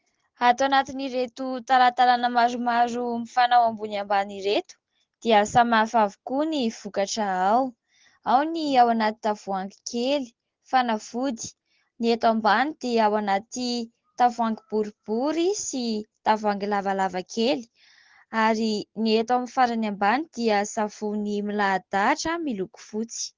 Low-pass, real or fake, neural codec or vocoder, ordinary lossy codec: 7.2 kHz; real; none; Opus, 16 kbps